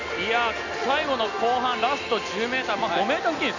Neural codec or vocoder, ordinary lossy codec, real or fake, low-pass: none; none; real; 7.2 kHz